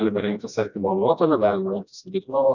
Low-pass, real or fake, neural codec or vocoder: 7.2 kHz; fake; codec, 16 kHz, 1 kbps, FreqCodec, smaller model